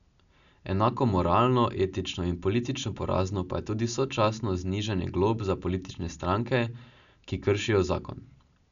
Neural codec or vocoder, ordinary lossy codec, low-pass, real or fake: none; none; 7.2 kHz; real